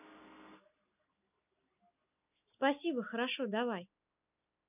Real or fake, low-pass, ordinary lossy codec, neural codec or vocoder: real; 3.6 kHz; none; none